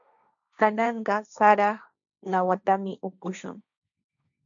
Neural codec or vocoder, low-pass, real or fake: codec, 16 kHz, 1.1 kbps, Voila-Tokenizer; 7.2 kHz; fake